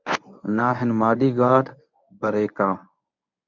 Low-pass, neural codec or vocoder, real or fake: 7.2 kHz; codec, 24 kHz, 0.9 kbps, WavTokenizer, medium speech release version 1; fake